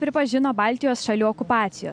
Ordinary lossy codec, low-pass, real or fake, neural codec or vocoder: MP3, 64 kbps; 9.9 kHz; real; none